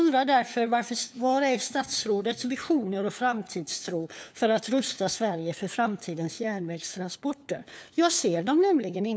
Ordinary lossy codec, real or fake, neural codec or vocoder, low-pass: none; fake; codec, 16 kHz, 4 kbps, FunCodec, trained on LibriTTS, 50 frames a second; none